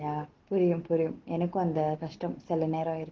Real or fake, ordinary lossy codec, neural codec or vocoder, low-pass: real; Opus, 16 kbps; none; 7.2 kHz